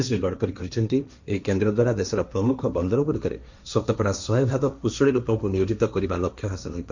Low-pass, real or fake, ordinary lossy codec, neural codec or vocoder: 7.2 kHz; fake; none; codec, 16 kHz, 1.1 kbps, Voila-Tokenizer